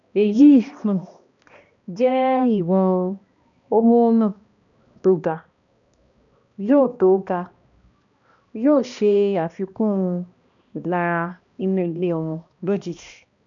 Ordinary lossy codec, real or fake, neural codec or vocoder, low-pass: none; fake; codec, 16 kHz, 1 kbps, X-Codec, HuBERT features, trained on balanced general audio; 7.2 kHz